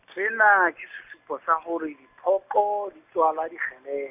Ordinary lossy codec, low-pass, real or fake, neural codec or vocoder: none; 3.6 kHz; real; none